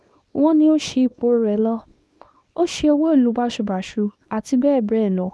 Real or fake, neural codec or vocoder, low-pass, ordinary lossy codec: fake; codec, 24 kHz, 0.9 kbps, WavTokenizer, small release; none; none